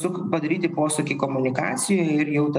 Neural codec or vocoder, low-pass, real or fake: none; 10.8 kHz; real